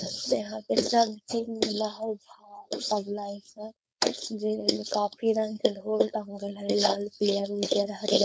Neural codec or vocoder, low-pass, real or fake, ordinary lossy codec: codec, 16 kHz, 4.8 kbps, FACodec; none; fake; none